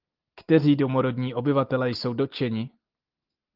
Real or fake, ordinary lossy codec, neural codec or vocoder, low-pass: real; Opus, 32 kbps; none; 5.4 kHz